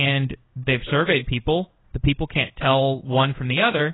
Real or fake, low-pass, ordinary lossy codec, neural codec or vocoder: fake; 7.2 kHz; AAC, 16 kbps; codec, 16 kHz in and 24 kHz out, 1 kbps, XY-Tokenizer